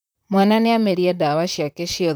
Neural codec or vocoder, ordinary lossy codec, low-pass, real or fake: vocoder, 44.1 kHz, 128 mel bands, Pupu-Vocoder; none; none; fake